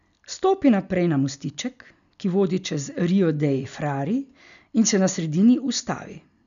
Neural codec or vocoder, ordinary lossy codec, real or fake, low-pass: none; none; real; 7.2 kHz